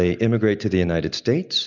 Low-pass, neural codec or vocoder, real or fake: 7.2 kHz; none; real